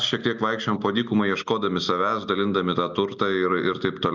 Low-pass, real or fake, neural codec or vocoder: 7.2 kHz; real; none